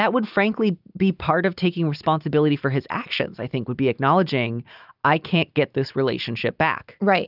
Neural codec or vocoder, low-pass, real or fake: none; 5.4 kHz; real